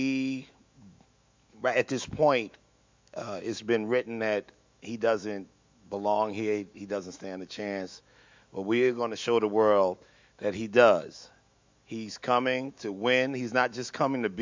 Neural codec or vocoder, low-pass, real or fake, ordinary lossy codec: autoencoder, 48 kHz, 128 numbers a frame, DAC-VAE, trained on Japanese speech; 7.2 kHz; fake; MP3, 64 kbps